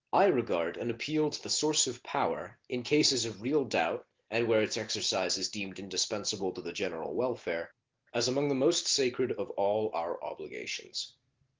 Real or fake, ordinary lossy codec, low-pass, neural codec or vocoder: real; Opus, 16 kbps; 7.2 kHz; none